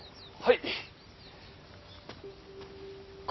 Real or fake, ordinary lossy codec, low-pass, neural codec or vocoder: real; MP3, 24 kbps; 7.2 kHz; none